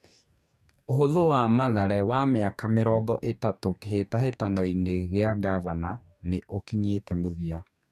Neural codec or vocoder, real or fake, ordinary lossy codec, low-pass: codec, 44.1 kHz, 2.6 kbps, DAC; fake; none; 14.4 kHz